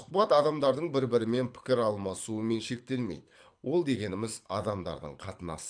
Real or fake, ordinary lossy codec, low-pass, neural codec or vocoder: fake; AAC, 64 kbps; 9.9 kHz; codec, 24 kHz, 6 kbps, HILCodec